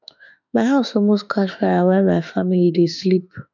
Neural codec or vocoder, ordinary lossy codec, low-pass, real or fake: autoencoder, 48 kHz, 32 numbers a frame, DAC-VAE, trained on Japanese speech; none; 7.2 kHz; fake